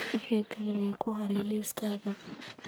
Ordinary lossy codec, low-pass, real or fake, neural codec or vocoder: none; none; fake; codec, 44.1 kHz, 1.7 kbps, Pupu-Codec